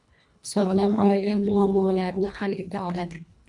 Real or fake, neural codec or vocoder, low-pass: fake; codec, 24 kHz, 1.5 kbps, HILCodec; 10.8 kHz